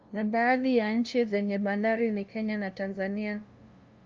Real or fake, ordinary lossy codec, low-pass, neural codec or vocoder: fake; Opus, 24 kbps; 7.2 kHz; codec, 16 kHz, 0.5 kbps, FunCodec, trained on LibriTTS, 25 frames a second